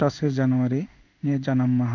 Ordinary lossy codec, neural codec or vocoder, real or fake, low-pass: none; codec, 16 kHz in and 24 kHz out, 1 kbps, XY-Tokenizer; fake; 7.2 kHz